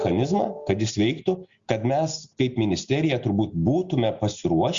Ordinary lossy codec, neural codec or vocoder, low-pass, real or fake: Opus, 64 kbps; none; 7.2 kHz; real